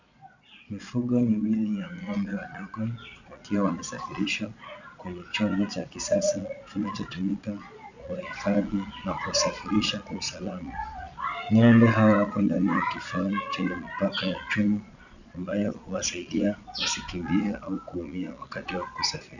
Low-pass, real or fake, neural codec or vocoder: 7.2 kHz; fake; vocoder, 22.05 kHz, 80 mel bands, Vocos